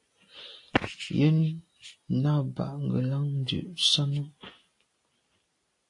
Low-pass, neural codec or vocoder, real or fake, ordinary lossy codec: 10.8 kHz; none; real; AAC, 48 kbps